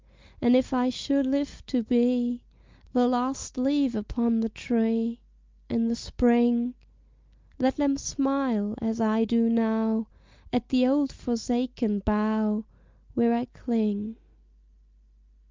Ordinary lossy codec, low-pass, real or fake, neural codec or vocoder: Opus, 24 kbps; 7.2 kHz; real; none